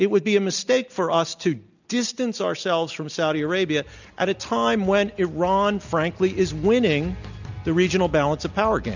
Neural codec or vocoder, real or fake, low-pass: none; real; 7.2 kHz